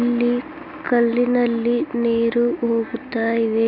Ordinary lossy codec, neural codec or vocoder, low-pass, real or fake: none; none; 5.4 kHz; real